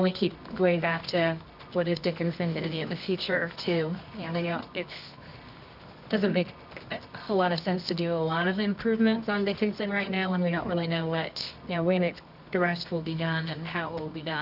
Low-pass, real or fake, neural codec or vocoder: 5.4 kHz; fake; codec, 24 kHz, 0.9 kbps, WavTokenizer, medium music audio release